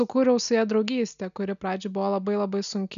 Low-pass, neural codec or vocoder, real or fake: 7.2 kHz; none; real